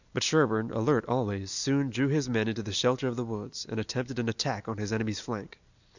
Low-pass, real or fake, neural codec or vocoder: 7.2 kHz; real; none